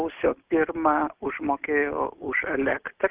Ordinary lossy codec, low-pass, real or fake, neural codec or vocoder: Opus, 24 kbps; 3.6 kHz; real; none